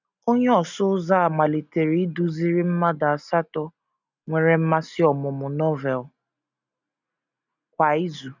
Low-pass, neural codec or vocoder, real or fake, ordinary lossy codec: 7.2 kHz; none; real; none